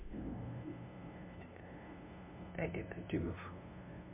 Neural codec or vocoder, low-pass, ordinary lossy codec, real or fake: codec, 16 kHz, 0.5 kbps, FunCodec, trained on LibriTTS, 25 frames a second; 3.6 kHz; MP3, 32 kbps; fake